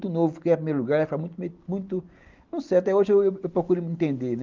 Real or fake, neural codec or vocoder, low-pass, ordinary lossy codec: real; none; 7.2 kHz; Opus, 24 kbps